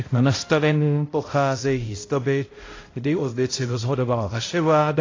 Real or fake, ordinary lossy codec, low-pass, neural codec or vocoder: fake; AAC, 32 kbps; 7.2 kHz; codec, 16 kHz, 0.5 kbps, X-Codec, HuBERT features, trained on balanced general audio